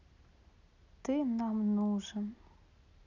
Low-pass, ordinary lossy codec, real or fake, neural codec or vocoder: 7.2 kHz; none; real; none